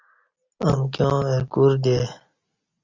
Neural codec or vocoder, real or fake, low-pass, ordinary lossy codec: none; real; 7.2 kHz; Opus, 64 kbps